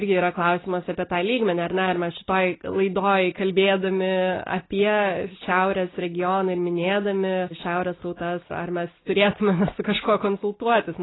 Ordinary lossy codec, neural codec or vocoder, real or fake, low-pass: AAC, 16 kbps; none; real; 7.2 kHz